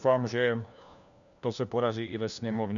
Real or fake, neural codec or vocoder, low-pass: fake; codec, 16 kHz, 1 kbps, FunCodec, trained on LibriTTS, 50 frames a second; 7.2 kHz